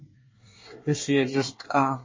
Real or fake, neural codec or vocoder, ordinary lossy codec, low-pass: fake; codec, 24 kHz, 1 kbps, SNAC; MP3, 32 kbps; 7.2 kHz